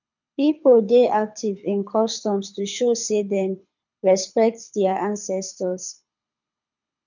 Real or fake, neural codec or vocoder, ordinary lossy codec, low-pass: fake; codec, 24 kHz, 6 kbps, HILCodec; none; 7.2 kHz